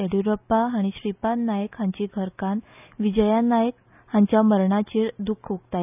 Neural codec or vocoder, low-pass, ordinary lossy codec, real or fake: none; 3.6 kHz; none; real